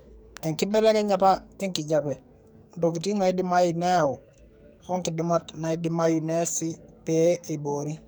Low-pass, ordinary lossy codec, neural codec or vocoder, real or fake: none; none; codec, 44.1 kHz, 2.6 kbps, SNAC; fake